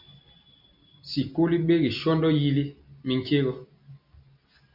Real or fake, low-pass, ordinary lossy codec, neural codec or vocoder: real; 5.4 kHz; MP3, 48 kbps; none